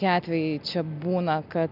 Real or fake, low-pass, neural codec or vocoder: real; 5.4 kHz; none